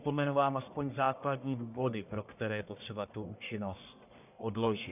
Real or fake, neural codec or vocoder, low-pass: fake; codec, 44.1 kHz, 1.7 kbps, Pupu-Codec; 3.6 kHz